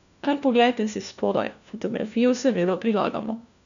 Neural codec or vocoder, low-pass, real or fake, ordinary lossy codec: codec, 16 kHz, 1 kbps, FunCodec, trained on LibriTTS, 50 frames a second; 7.2 kHz; fake; none